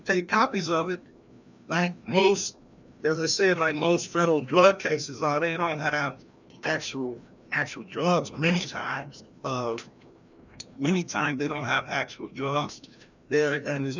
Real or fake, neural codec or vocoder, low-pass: fake; codec, 16 kHz, 1 kbps, FreqCodec, larger model; 7.2 kHz